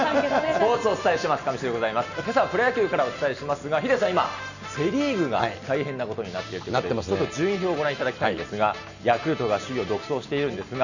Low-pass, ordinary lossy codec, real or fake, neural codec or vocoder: 7.2 kHz; none; real; none